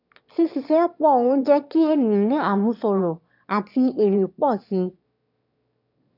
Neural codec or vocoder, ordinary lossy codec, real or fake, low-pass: autoencoder, 22.05 kHz, a latent of 192 numbers a frame, VITS, trained on one speaker; none; fake; 5.4 kHz